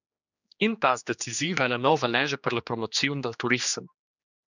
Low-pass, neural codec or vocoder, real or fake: 7.2 kHz; codec, 16 kHz, 2 kbps, X-Codec, HuBERT features, trained on general audio; fake